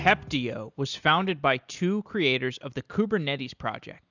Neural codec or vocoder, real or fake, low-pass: none; real; 7.2 kHz